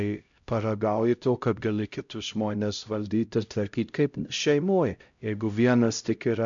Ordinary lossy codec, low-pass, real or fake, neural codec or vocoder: MP3, 64 kbps; 7.2 kHz; fake; codec, 16 kHz, 0.5 kbps, X-Codec, HuBERT features, trained on LibriSpeech